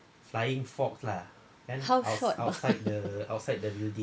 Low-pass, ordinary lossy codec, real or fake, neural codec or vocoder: none; none; real; none